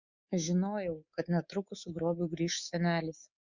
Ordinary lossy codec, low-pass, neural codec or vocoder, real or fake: Opus, 64 kbps; 7.2 kHz; codec, 24 kHz, 3.1 kbps, DualCodec; fake